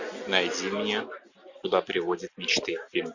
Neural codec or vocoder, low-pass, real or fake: none; 7.2 kHz; real